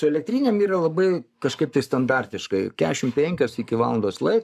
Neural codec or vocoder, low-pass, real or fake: codec, 44.1 kHz, 7.8 kbps, Pupu-Codec; 14.4 kHz; fake